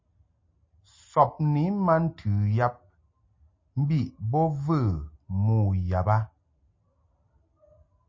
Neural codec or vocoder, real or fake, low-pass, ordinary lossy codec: none; real; 7.2 kHz; MP3, 32 kbps